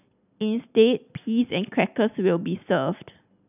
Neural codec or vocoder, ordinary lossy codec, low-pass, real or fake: none; none; 3.6 kHz; real